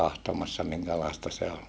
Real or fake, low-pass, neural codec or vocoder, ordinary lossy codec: real; none; none; none